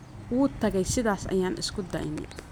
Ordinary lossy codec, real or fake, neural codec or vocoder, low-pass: none; real; none; none